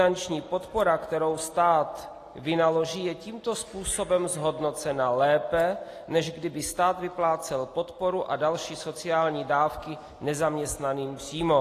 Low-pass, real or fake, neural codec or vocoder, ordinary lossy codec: 14.4 kHz; real; none; AAC, 48 kbps